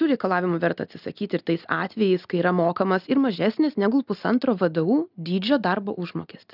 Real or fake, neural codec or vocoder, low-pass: real; none; 5.4 kHz